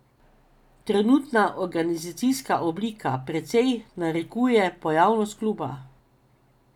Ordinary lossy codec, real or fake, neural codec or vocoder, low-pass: none; real; none; 19.8 kHz